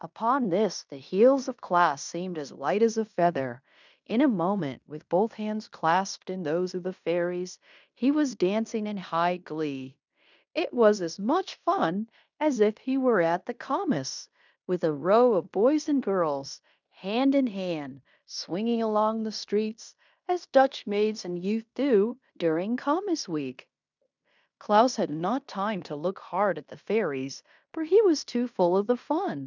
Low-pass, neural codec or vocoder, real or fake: 7.2 kHz; codec, 16 kHz in and 24 kHz out, 0.9 kbps, LongCat-Audio-Codec, fine tuned four codebook decoder; fake